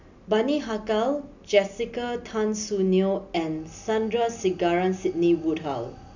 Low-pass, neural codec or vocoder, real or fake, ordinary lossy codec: 7.2 kHz; none; real; none